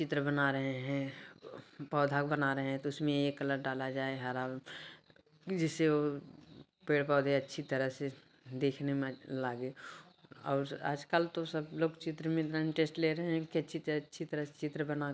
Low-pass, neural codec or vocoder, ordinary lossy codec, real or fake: none; none; none; real